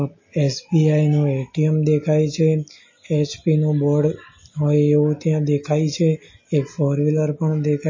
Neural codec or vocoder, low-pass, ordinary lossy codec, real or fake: none; 7.2 kHz; MP3, 32 kbps; real